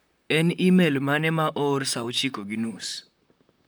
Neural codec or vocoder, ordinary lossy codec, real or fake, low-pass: vocoder, 44.1 kHz, 128 mel bands, Pupu-Vocoder; none; fake; none